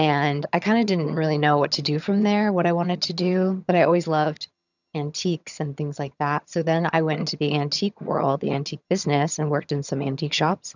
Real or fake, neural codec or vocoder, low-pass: fake; vocoder, 22.05 kHz, 80 mel bands, HiFi-GAN; 7.2 kHz